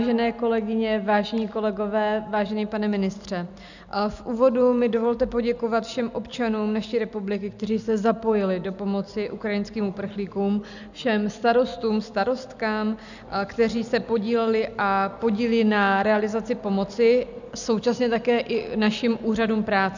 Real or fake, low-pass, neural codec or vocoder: real; 7.2 kHz; none